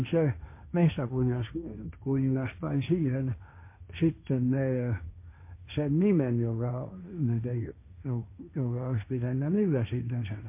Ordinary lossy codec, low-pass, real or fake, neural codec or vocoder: MP3, 24 kbps; 3.6 kHz; fake; codec, 16 kHz, 1.1 kbps, Voila-Tokenizer